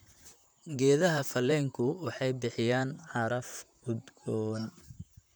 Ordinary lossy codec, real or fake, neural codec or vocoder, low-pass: none; fake; vocoder, 44.1 kHz, 128 mel bands, Pupu-Vocoder; none